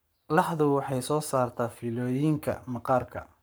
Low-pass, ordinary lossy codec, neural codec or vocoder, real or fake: none; none; codec, 44.1 kHz, 7.8 kbps, Pupu-Codec; fake